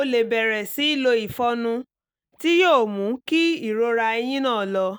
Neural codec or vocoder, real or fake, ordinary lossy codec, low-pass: none; real; none; none